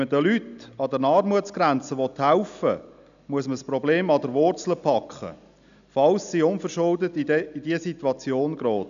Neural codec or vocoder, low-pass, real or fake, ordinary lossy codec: none; 7.2 kHz; real; none